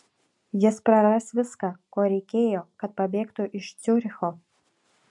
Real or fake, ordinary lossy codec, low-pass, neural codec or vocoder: real; MP3, 64 kbps; 10.8 kHz; none